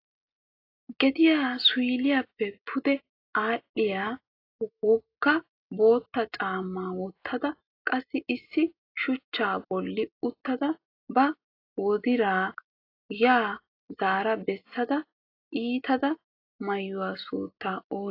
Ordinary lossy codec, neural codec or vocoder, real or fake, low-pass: AAC, 32 kbps; none; real; 5.4 kHz